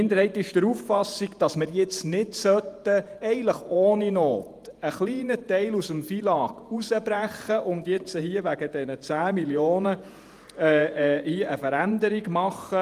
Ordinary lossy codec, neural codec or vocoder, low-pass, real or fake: Opus, 32 kbps; vocoder, 48 kHz, 128 mel bands, Vocos; 14.4 kHz; fake